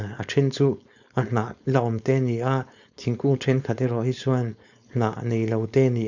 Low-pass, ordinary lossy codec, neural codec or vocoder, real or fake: 7.2 kHz; none; codec, 16 kHz, 4.8 kbps, FACodec; fake